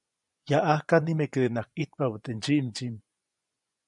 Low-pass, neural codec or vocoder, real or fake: 10.8 kHz; vocoder, 24 kHz, 100 mel bands, Vocos; fake